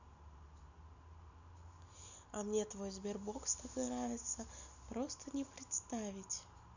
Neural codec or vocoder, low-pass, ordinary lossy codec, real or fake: none; 7.2 kHz; none; real